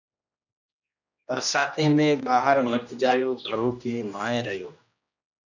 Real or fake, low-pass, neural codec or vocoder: fake; 7.2 kHz; codec, 16 kHz, 1 kbps, X-Codec, HuBERT features, trained on general audio